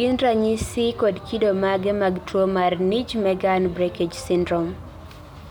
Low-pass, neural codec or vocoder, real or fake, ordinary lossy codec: none; none; real; none